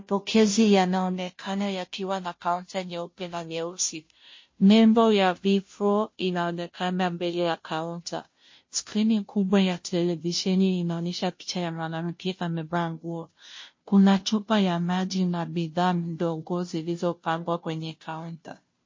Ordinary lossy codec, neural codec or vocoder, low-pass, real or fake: MP3, 32 kbps; codec, 16 kHz, 0.5 kbps, FunCodec, trained on Chinese and English, 25 frames a second; 7.2 kHz; fake